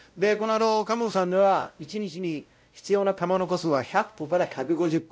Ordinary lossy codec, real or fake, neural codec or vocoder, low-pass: none; fake; codec, 16 kHz, 0.5 kbps, X-Codec, WavLM features, trained on Multilingual LibriSpeech; none